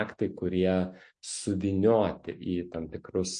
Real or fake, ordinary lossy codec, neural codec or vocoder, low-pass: real; MP3, 48 kbps; none; 9.9 kHz